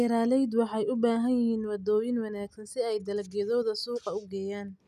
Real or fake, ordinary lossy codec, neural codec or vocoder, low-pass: real; none; none; 14.4 kHz